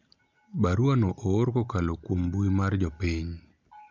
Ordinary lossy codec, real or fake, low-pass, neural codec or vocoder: none; real; 7.2 kHz; none